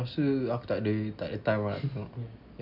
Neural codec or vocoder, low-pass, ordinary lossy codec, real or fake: none; 5.4 kHz; none; real